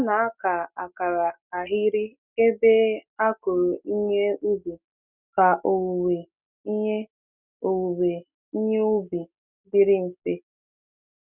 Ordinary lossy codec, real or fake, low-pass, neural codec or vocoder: none; real; 3.6 kHz; none